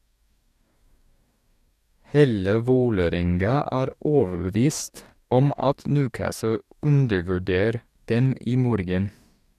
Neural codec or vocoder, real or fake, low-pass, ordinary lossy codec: codec, 44.1 kHz, 2.6 kbps, DAC; fake; 14.4 kHz; none